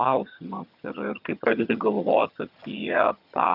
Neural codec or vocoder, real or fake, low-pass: vocoder, 22.05 kHz, 80 mel bands, HiFi-GAN; fake; 5.4 kHz